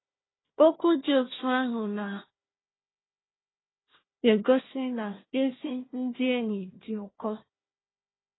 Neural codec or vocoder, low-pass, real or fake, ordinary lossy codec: codec, 16 kHz, 1 kbps, FunCodec, trained on Chinese and English, 50 frames a second; 7.2 kHz; fake; AAC, 16 kbps